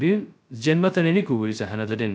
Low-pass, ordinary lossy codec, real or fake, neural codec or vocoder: none; none; fake; codec, 16 kHz, 0.2 kbps, FocalCodec